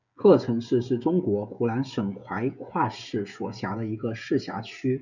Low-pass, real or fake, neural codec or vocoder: 7.2 kHz; fake; codec, 16 kHz, 16 kbps, FreqCodec, smaller model